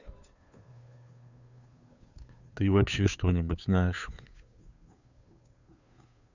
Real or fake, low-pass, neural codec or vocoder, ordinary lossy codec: fake; 7.2 kHz; codec, 16 kHz, 2 kbps, FunCodec, trained on Chinese and English, 25 frames a second; none